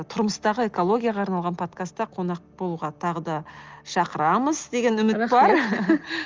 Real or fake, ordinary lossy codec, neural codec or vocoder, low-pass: real; Opus, 32 kbps; none; 7.2 kHz